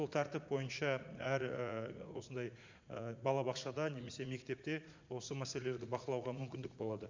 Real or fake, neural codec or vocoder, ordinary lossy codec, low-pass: fake; vocoder, 44.1 kHz, 80 mel bands, Vocos; MP3, 64 kbps; 7.2 kHz